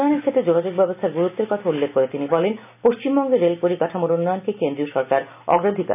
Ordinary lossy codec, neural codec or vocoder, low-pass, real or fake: none; none; 3.6 kHz; real